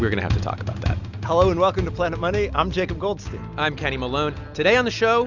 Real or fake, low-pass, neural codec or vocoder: real; 7.2 kHz; none